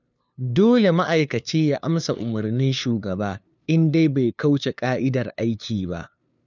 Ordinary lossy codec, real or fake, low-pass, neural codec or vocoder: none; fake; 7.2 kHz; codec, 16 kHz, 2 kbps, FunCodec, trained on LibriTTS, 25 frames a second